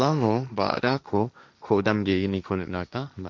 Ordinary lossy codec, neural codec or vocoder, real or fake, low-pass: none; codec, 16 kHz, 1.1 kbps, Voila-Tokenizer; fake; none